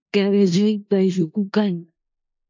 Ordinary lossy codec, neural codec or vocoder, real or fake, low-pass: MP3, 48 kbps; codec, 16 kHz in and 24 kHz out, 0.4 kbps, LongCat-Audio-Codec, four codebook decoder; fake; 7.2 kHz